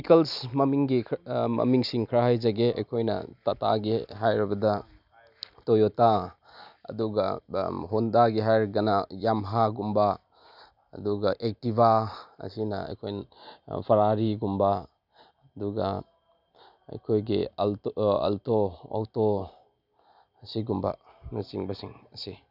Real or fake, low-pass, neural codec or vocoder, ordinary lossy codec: real; 5.4 kHz; none; none